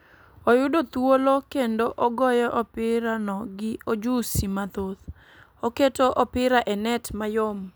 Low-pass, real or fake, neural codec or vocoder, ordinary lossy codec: none; real; none; none